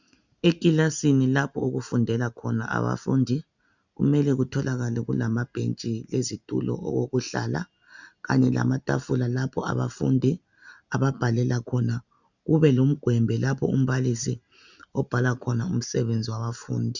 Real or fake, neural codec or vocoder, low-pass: real; none; 7.2 kHz